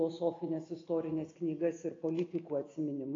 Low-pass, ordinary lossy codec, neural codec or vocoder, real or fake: 7.2 kHz; AAC, 32 kbps; none; real